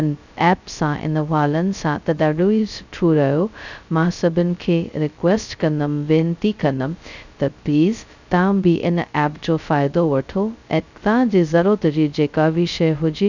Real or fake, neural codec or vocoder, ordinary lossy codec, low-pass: fake; codec, 16 kHz, 0.2 kbps, FocalCodec; none; 7.2 kHz